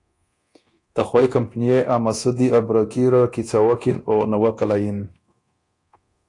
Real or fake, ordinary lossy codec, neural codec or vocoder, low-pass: fake; AAC, 48 kbps; codec, 24 kHz, 0.9 kbps, DualCodec; 10.8 kHz